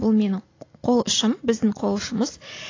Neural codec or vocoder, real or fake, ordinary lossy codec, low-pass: none; real; AAC, 32 kbps; 7.2 kHz